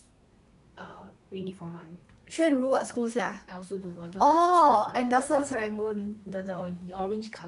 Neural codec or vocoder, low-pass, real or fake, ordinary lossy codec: codec, 24 kHz, 1 kbps, SNAC; 10.8 kHz; fake; none